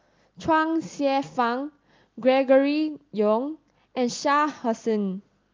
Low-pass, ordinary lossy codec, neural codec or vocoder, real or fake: 7.2 kHz; Opus, 24 kbps; none; real